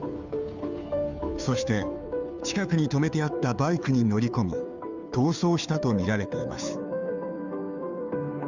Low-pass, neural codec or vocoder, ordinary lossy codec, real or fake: 7.2 kHz; codec, 16 kHz, 2 kbps, FunCodec, trained on Chinese and English, 25 frames a second; none; fake